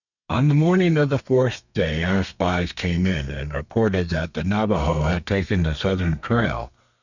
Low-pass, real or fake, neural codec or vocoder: 7.2 kHz; fake; codec, 32 kHz, 1.9 kbps, SNAC